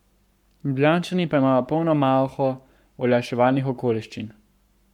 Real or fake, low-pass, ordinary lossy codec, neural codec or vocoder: fake; 19.8 kHz; none; codec, 44.1 kHz, 7.8 kbps, Pupu-Codec